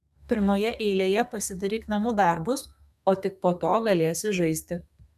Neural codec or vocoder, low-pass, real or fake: codec, 32 kHz, 1.9 kbps, SNAC; 14.4 kHz; fake